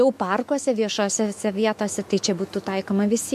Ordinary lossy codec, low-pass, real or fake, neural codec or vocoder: MP3, 64 kbps; 14.4 kHz; fake; autoencoder, 48 kHz, 128 numbers a frame, DAC-VAE, trained on Japanese speech